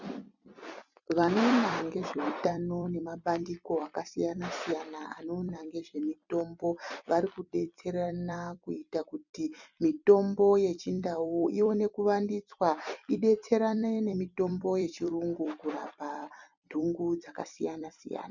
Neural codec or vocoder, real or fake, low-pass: vocoder, 44.1 kHz, 128 mel bands every 256 samples, BigVGAN v2; fake; 7.2 kHz